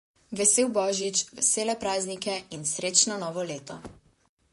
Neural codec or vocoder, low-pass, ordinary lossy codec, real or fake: vocoder, 44.1 kHz, 128 mel bands, Pupu-Vocoder; 14.4 kHz; MP3, 48 kbps; fake